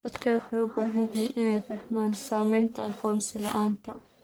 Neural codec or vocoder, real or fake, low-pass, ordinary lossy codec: codec, 44.1 kHz, 1.7 kbps, Pupu-Codec; fake; none; none